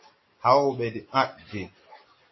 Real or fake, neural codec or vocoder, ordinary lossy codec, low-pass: real; none; MP3, 24 kbps; 7.2 kHz